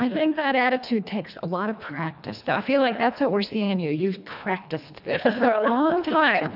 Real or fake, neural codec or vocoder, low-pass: fake; codec, 24 kHz, 1.5 kbps, HILCodec; 5.4 kHz